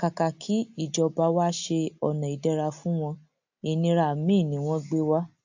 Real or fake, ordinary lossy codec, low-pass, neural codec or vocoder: real; AAC, 48 kbps; 7.2 kHz; none